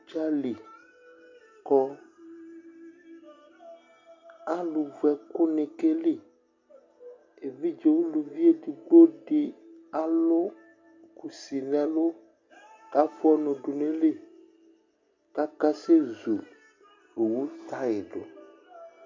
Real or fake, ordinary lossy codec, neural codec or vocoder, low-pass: real; MP3, 48 kbps; none; 7.2 kHz